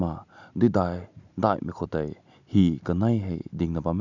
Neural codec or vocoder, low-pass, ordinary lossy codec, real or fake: none; 7.2 kHz; none; real